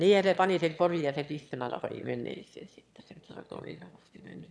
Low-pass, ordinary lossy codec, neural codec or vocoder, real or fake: none; none; autoencoder, 22.05 kHz, a latent of 192 numbers a frame, VITS, trained on one speaker; fake